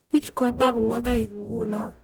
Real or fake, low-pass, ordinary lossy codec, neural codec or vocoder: fake; none; none; codec, 44.1 kHz, 0.9 kbps, DAC